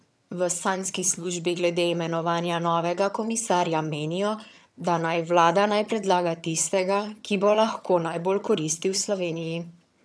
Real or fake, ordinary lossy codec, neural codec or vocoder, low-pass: fake; none; vocoder, 22.05 kHz, 80 mel bands, HiFi-GAN; none